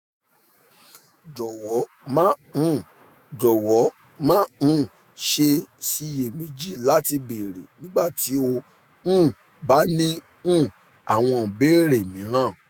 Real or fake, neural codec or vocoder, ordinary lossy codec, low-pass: fake; autoencoder, 48 kHz, 128 numbers a frame, DAC-VAE, trained on Japanese speech; none; none